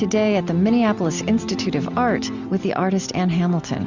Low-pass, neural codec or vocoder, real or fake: 7.2 kHz; none; real